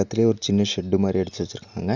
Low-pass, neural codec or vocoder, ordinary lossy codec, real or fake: 7.2 kHz; none; Opus, 64 kbps; real